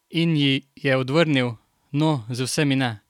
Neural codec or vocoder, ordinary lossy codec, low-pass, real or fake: none; none; 19.8 kHz; real